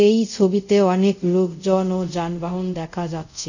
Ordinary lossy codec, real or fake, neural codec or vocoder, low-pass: none; fake; codec, 24 kHz, 0.5 kbps, DualCodec; 7.2 kHz